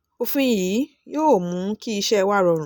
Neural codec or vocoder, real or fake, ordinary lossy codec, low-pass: none; real; none; none